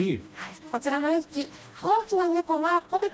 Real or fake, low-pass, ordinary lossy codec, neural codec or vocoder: fake; none; none; codec, 16 kHz, 1 kbps, FreqCodec, smaller model